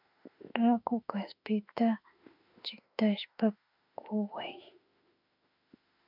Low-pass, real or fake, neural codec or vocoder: 5.4 kHz; fake; autoencoder, 48 kHz, 32 numbers a frame, DAC-VAE, trained on Japanese speech